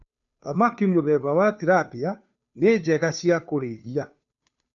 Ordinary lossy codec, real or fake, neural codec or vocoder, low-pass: none; fake; codec, 16 kHz, 2 kbps, FunCodec, trained on Chinese and English, 25 frames a second; 7.2 kHz